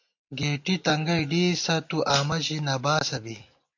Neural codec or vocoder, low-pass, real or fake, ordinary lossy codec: none; 7.2 kHz; real; AAC, 48 kbps